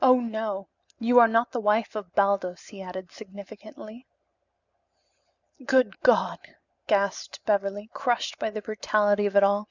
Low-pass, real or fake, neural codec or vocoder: 7.2 kHz; real; none